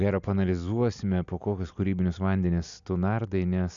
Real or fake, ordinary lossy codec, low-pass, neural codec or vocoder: real; MP3, 96 kbps; 7.2 kHz; none